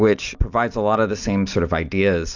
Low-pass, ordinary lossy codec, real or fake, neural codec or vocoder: 7.2 kHz; Opus, 64 kbps; real; none